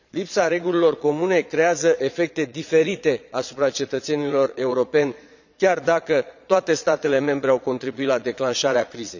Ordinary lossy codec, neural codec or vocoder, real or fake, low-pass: none; vocoder, 22.05 kHz, 80 mel bands, Vocos; fake; 7.2 kHz